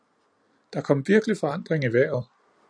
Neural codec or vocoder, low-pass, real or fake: vocoder, 44.1 kHz, 128 mel bands every 256 samples, BigVGAN v2; 9.9 kHz; fake